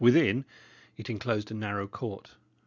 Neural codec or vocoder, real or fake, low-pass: none; real; 7.2 kHz